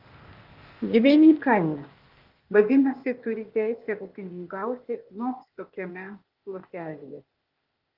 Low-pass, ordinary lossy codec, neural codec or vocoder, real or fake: 5.4 kHz; Opus, 32 kbps; codec, 16 kHz, 0.8 kbps, ZipCodec; fake